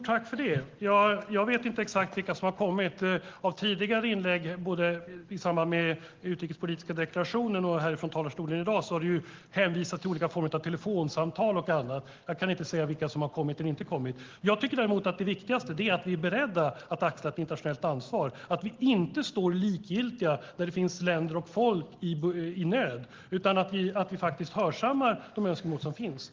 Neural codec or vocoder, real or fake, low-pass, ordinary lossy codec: none; real; 7.2 kHz; Opus, 16 kbps